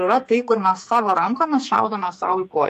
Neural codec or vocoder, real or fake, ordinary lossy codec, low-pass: codec, 44.1 kHz, 2.6 kbps, SNAC; fake; AAC, 64 kbps; 14.4 kHz